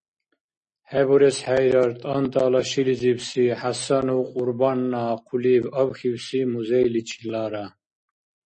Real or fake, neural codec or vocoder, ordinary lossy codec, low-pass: real; none; MP3, 32 kbps; 10.8 kHz